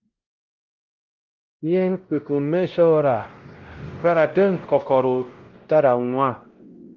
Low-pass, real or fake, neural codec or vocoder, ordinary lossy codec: 7.2 kHz; fake; codec, 16 kHz, 0.5 kbps, X-Codec, WavLM features, trained on Multilingual LibriSpeech; Opus, 16 kbps